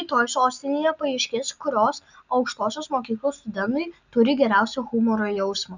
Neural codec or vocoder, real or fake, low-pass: none; real; 7.2 kHz